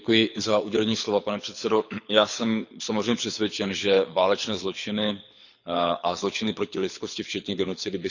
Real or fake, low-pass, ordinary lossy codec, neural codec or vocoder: fake; 7.2 kHz; none; codec, 24 kHz, 6 kbps, HILCodec